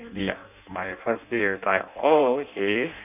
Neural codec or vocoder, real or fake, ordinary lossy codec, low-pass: codec, 16 kHz in and 24 kHz out, 0.6 kbps, FireRedTTS-2 codec; fake; none; 3.6 kHz